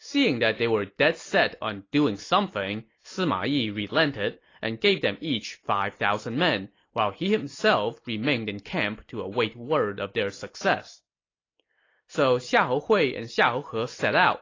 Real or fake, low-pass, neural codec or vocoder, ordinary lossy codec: real; 7.2 kHz; none; AAC, 32 kbps